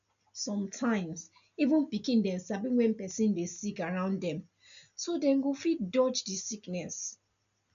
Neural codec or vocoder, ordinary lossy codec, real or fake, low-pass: none; none; real; 7.2 kHz